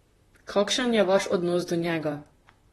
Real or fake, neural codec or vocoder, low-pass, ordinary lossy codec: fake; vocoder, 44.1 kHz, 128 mel bands, Pupu-Vocoder; 19.8 kHz; AAC, 32 kbps